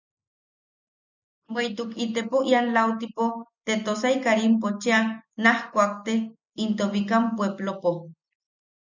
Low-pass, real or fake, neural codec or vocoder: 7.2 kHz; real; none